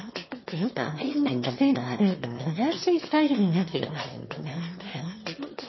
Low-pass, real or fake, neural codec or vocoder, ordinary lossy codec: 7.2 kHz; fake; autoencoder, 22.05 kHz, a latent of 192 numbers a frame, VITS, trained on one speaker; MP3, 24 kbps